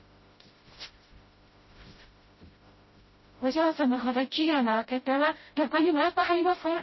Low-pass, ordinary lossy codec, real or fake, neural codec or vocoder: 7.2 kHz; MP3, 24 kbps; fake; codec, 16 kHz, 0.5 kbps, FreqCodec, smaller model